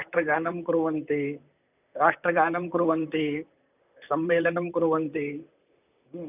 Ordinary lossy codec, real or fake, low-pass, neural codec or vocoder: none; fake; 3.6 kHz; vocoder, 44.1 kHz, 128 mel bands, Pupu-Vocoder